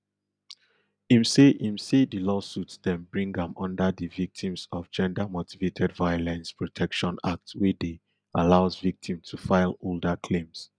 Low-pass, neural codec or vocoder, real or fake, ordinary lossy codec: 9.9 kHz; none; real; none